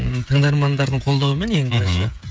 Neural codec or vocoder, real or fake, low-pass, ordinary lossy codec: codec, 16 kHz, 16 kbps, FreqCodec, smaller model; fake; none; none